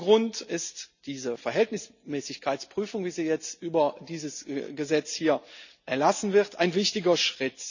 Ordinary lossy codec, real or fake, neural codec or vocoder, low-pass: none; real; none; 7.2 kHz